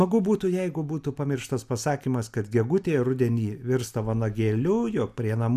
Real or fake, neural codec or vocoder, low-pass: real; none; 14.4 kHz